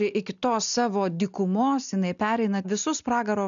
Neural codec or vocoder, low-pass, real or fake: none; 7.2 kHz; real